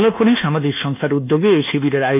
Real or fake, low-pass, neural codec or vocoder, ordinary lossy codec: fake; 3.6 kHz; codec, 24 kHz, 1.2 kbps, DualCodec; none